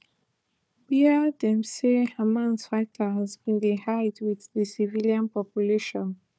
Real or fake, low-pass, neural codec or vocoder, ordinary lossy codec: fake; none; codec, 16 kHz, 4 kbps, FunCodec, trained on Chinese and English, 50 frames a second; none